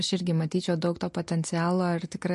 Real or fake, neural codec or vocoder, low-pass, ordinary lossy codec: real; none; 14.4 kHz; MP3, 48 kbps